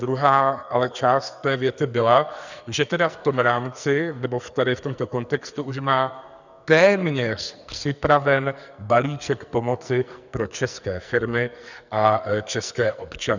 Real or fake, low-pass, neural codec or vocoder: fake; 7.2 kHz; codec, 44.1 kHz, 2.6 kbps, SNAC